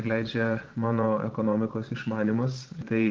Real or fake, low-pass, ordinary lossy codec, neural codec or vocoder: fake; 7.2 kHz; Opus, 16 kbps; vocoder, 22.05 kHz, 80 mel bands, Vocos